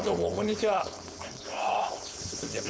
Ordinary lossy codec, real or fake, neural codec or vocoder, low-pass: none; fake; codec, 16 kHz, 4.8 kbps, FACodec; none